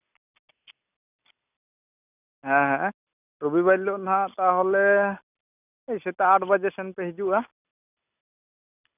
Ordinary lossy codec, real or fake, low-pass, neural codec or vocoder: none; real; 3.6 kHz; none